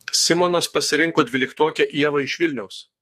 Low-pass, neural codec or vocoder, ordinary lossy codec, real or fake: 14.4 kHz; codec, 44.1 kHz, 2.6 kbps, SNAC; MP3, 64 kbps; fake